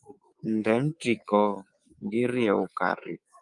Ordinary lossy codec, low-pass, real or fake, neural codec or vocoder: Opus, 32 kbps; 10.8 kHz; fake; codec, 24 kHz, 3.1 kbps, DualCodec